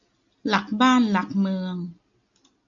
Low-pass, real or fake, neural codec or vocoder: 7.2 kHz; real; none